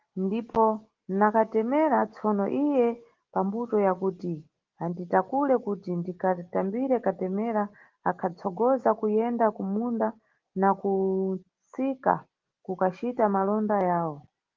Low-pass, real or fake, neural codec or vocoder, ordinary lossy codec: 7.2 kHz; real; none; Opus, 16 kbps